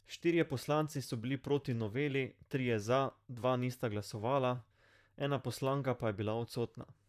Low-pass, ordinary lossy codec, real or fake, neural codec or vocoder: 14.4 kHz; none; fake; vocoder, 44.1 kHz, 128 mel bands, Pupu-Vocoder